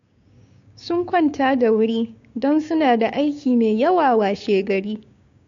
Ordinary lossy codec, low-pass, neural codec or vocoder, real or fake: AAC, 48 kbps; 7.2 kHz; codec, 16 kHz, 6 kbps, DAC; fake